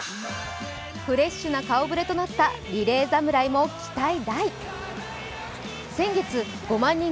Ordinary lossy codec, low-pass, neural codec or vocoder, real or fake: none; none; none; real